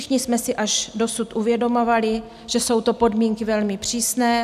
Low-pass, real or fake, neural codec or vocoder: 14.4 kHz; real; none